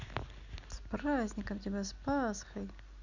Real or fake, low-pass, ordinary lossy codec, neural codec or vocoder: real; 7.2 kHz; none; none